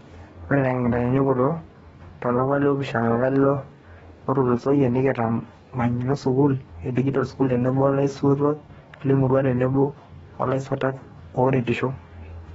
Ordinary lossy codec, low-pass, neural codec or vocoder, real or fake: AAC, 24 kbps; 19.8 kHz; codec, 44.1 kHz, 2.6 kbps, DAC; fake